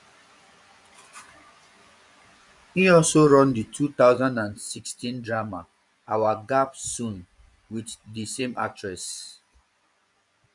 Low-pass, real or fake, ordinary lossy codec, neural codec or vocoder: 10.8 kHz; fake; none; vocoder, 24 kHz, 100 mel bands, Vocos